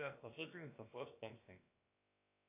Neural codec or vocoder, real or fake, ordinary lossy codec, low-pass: codec, 16 kHz, about 1 kbps, DyCAST, with the encoder's durations; fake; AAC, 32 kbps; 3.6 kHz